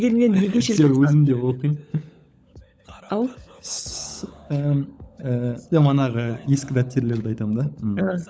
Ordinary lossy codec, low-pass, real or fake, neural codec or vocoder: none; none; fake; codec, 16 kHz, 16 kbps, FunCodec, trained on LibriTTS, 50 frames a second